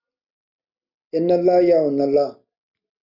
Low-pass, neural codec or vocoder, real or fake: 5.4 kHz; none; real